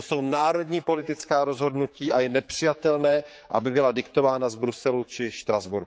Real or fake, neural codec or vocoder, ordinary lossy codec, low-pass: fake; codec, 16 kHz, 4 kbps, X-Codec, HuBERT features, trained on general audio; none; none